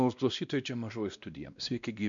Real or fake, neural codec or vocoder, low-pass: fake; codec, 16 kHz, 1 kbps, X-Codec, WavLM features, trained on Multilingual LibriSpeech; 7.2 kHz